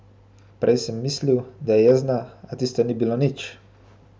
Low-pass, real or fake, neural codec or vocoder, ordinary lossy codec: none; real; none; none